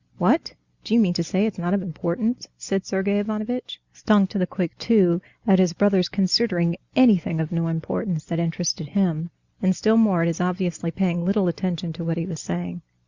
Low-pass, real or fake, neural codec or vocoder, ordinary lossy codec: 7.2 kHz; real; none; Opus, 64 kbps